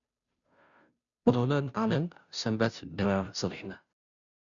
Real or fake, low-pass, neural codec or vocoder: fake; 7.2 kHz; codec, 16 kHz, 0.5 kbps, FunCodec, trained on Chinese and English, 25 frames a second